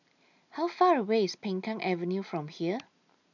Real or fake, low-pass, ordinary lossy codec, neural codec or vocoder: real; 7.2 kHz; none; none